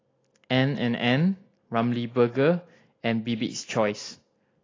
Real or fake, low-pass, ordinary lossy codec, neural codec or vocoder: real; 7.2 kHz; AAC, 32 kbps; none